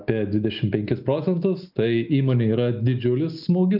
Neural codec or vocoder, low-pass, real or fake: none; 5.4 kHz; real